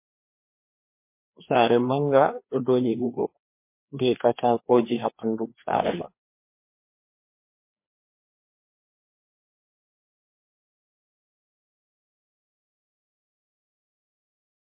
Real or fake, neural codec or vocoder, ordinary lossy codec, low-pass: fake; codec, 16 kHz, 2 kbps, FreqCodec, larger model; MP3, 24 kbps; 3.6 kHz